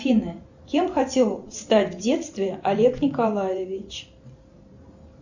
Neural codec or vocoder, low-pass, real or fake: vocoder, 44.1 kHz, 128 mel bands every 512 samples, BigVGAN v2; 7.2 kHz; fake